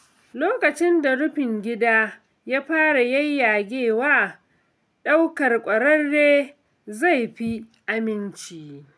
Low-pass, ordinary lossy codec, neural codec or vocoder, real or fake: none; none; none; real